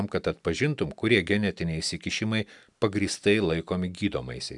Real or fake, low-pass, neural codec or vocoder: real; 10.8 kHz; none